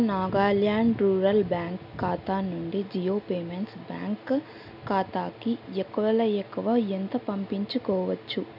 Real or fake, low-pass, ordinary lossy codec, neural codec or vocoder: real; 5.4 kHz; MP3, 48 kbps; none